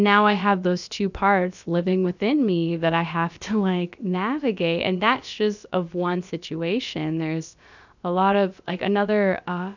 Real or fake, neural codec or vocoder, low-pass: fake; codec, 16 kHz, about 1 kbps, DyCAST, with the encoder's durations; 7.2 kHz